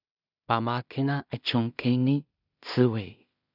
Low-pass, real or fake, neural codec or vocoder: 5.4 kHz; fake; codec, 16 kHz in and 24 kHz out, 0.4 kbps, LongCat-Audio-Codec, two codebook decoder